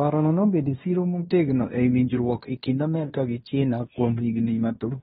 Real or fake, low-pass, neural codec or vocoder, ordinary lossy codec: fake; 10.8 kHz; codec, 16 kHz in and 24 kHz out, 0.9 kbps, LongCat-Audio-Codec, fine tuned four codebook decoder; AAC, 16 kbps